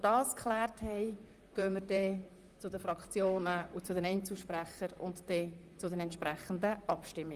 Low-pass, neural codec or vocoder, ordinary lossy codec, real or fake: 14.4 kHz; vocoder, 44.1 kHz, 128 mel bands, Pupu-Vocoder; Opus, 32 kbps; fake